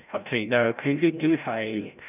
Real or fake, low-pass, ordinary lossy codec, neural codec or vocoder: fake; 3.6 kHz; none; codec, 16 kHz, 0.5 kbps, FreqCodec, larger model